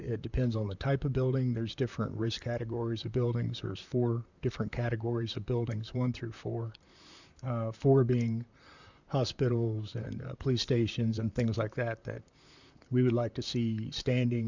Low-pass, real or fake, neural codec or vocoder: 7.2 kHz; fake; vocoder, 44.1 kHz, 128 mel bands, Pupu-Vocoder